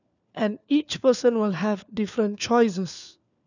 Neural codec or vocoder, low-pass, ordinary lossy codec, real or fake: codec, 16 kHz, 4 kbps, FunCodec, trained on LibriTTS, 50 frames a second; 7.2 kHz; none; fake